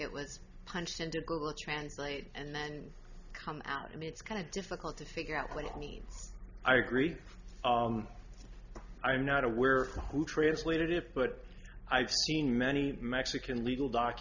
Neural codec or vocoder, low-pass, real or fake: none; 7.2 kHz; real